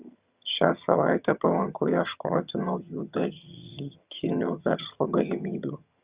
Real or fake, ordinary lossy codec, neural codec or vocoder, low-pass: fake; Opus, 64 kbps; vocoder, 22.05 kHz, 80 mel bands, HiFi-GAN; 3.6 kHz